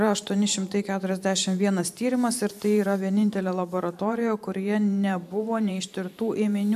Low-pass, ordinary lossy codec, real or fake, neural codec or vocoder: 14.4 kHz; AAC, 96 kbps; fake; vocoder, 44.1 kHz, 128 mel bands every 512 samples, BigVGAN v2